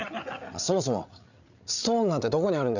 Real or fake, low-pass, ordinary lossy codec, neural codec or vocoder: fake; 7.2 kHz; none; codec, 16 kHz, 8 kbps, FreqCodec, larger model